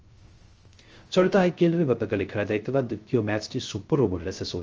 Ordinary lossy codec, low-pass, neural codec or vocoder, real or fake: Opus, 24 kbps; 7.2 kHz; codec, 16 kHz, 0.3 kbps, FocalCodec; fake